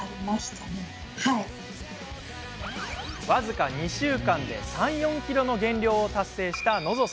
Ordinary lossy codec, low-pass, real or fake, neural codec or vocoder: none; none; real; none